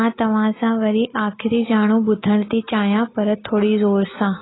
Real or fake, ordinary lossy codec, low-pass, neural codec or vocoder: real; AAC, 16 kbps; 7.2 kHz; none